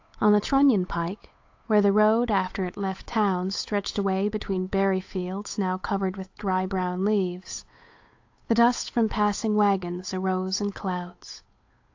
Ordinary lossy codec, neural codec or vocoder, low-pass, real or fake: AAC, 48 kbps; codec, 16 kHz, 8 kbps, FunCodec, trained on Chinese and English, 25 frames a second; 7.2 kHz; fake